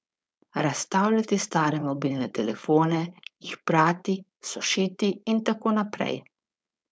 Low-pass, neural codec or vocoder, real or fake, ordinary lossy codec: none; codec, 16 kHz, 4.8 kbps, FACodec; fake; none